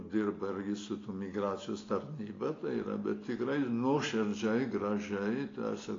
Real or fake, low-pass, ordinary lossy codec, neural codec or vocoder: real; 7.2 kHz; AAC, 32 kbps; none